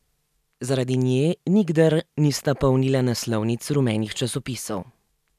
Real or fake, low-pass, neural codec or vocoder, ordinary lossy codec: real; 14.4 kHz; none; none